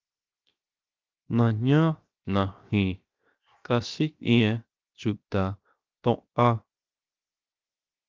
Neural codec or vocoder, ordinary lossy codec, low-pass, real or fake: codec, 16 kHz, 0.7 kbps, FocalCodec; Opus, 24 kbps; 7.2 kHz; fake